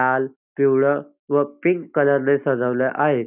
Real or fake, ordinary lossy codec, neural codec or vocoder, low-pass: real; none; none; 3.6 kHz